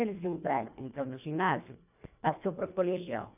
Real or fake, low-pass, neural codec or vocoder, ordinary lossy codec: fake; 3.6 kHz; codec, 24 kHz, 1.5 kbps, HILCodec; none